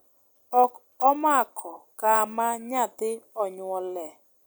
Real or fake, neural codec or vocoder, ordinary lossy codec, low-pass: real; none; none; none